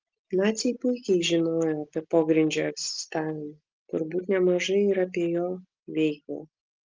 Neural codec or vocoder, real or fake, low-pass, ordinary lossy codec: none; real; 7.2 kHz; Opus, 32 kbps